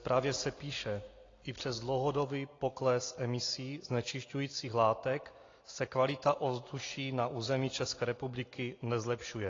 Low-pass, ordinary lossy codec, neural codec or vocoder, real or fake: 7.2 kHz; AAC, 32 kbps; none; real